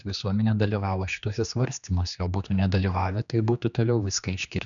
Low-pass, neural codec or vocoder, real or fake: 7.2 kHz; codec, 16 kHz, 2 kbps, X-Codec, HuBERT features, trained on general audio; fake